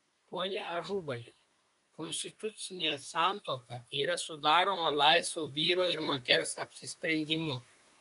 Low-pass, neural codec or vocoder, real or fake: 10.8 kHz; codec, 24 kHz, 1 kbps, SNAC; fake